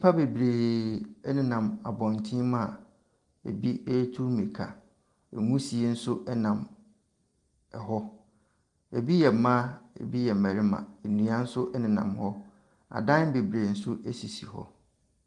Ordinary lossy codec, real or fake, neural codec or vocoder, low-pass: Opus, 24 kbps; real; none; 10.8 kHz